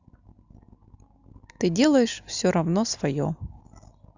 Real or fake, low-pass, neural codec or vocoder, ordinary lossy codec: real; 7.2 kHz; none; Opus, 64 kbps